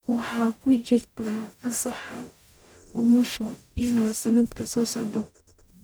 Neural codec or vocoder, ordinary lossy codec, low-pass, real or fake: codec, 44.1 kHz, 0.9 kbps, DAC; none; none; fake